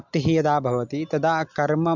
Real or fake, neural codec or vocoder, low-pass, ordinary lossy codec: real; none; 7.2 kHz; MP3, 64 kbps